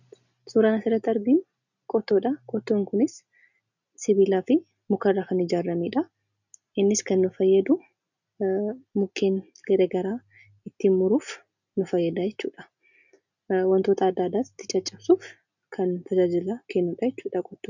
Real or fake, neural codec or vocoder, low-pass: real; none; 7.2 kHz